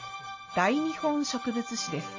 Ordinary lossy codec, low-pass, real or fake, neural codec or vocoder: MP3, 32 kbps; 7.2 kHz; real; none